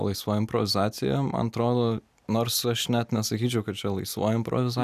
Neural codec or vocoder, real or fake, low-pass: none; real; 14.4 kHz